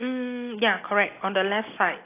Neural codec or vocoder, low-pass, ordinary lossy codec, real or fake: none; 3.6 kHz; AAC, 24 kbps; real